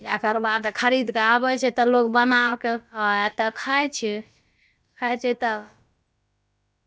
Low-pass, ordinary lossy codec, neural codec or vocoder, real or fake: none; none; codec, 16 kHz, about 1 kbps, DyCAST, with the encoder's durations; fake